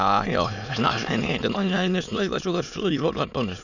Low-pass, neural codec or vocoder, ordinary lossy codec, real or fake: 7.2 kHz; autoencoder, 22.05 kHz, a latent of 192 numbers a frame, VITS, trained on many speakers; none; fake